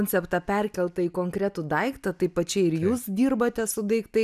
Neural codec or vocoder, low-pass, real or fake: none; 14.4 kHz; real